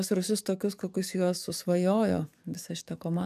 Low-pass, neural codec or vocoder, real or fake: 14.4 kHz; codec, 44.1 kHz, 7.8 kbps, DAC; fake